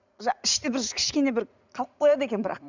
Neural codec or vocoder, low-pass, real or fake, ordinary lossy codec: vocoder, 44.1 kHz, 80 mel bands, Vocos; 7.2 kHz; fake; none